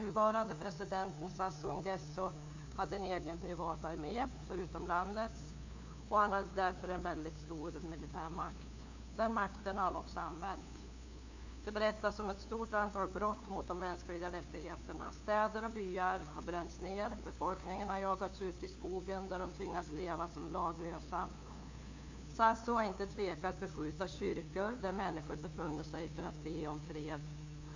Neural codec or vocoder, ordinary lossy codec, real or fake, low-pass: codec, 16 kHz, 2 kbps, FunCodec, trained on LibriTTS, 25 frames a second; AAC, 48 kbps; fake; 7.2 kHz